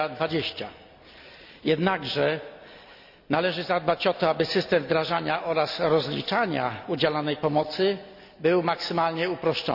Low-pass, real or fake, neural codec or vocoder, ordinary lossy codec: 5.4 kHz; real; none; none